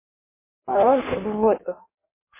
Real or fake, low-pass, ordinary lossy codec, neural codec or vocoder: fake; 3.6 kHz; MP3, 16 kbps; codec, 16 kHz in and 24 kHz out, 1.1 kbps, FireRedTTS-2 codec